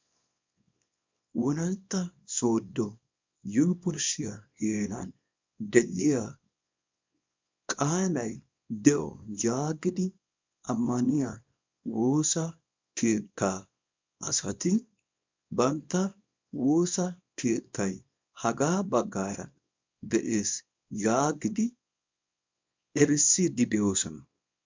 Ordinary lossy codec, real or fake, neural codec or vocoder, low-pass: MP3, 64 kbps; fake; codec, 24 kHz, 0.9 kbps, WavTokenizer, small release; 7.2 kHz